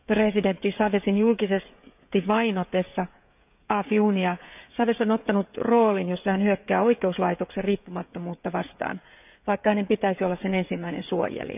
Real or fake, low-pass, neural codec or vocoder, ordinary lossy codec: fake; 3.6 kHz; codec, 16 kHz, 16 kbps, FreqCodec, smaller model; none